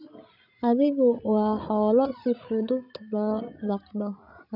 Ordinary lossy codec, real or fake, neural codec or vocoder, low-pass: none; fake; codec, 16 kHz, 16 kbps, FreqCodec, larger model; 5.4 kHz